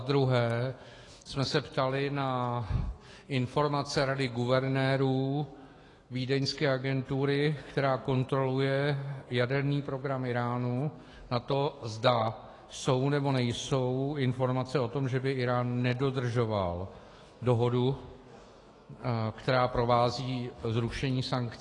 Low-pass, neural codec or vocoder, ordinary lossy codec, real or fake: 10.8 kHz; autoencoder, 48 kHz, 128 numbers a frame, DAC-VAE, trained on Japanese speech; AAC, 32 kbps; fake